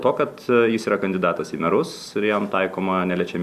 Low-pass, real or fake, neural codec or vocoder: 14.4 kHz; real; none